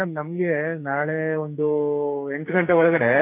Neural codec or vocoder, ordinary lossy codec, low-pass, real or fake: codec, 44.1 kHz, 2.6 kbps, SNAC; none; 3.6 kHz; fake